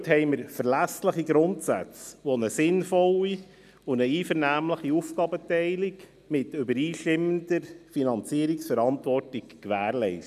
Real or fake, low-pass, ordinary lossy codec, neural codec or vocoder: real; 14.4 kHz; none; none